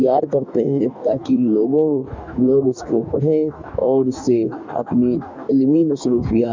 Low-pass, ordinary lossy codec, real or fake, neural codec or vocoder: 7.2 kHz; MP3, 64 kbps; fake; codec, 44.1 kHz, 2.6 kbps, DAC